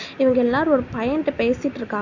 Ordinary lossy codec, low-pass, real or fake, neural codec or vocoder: none; 7.2 kHz; real; none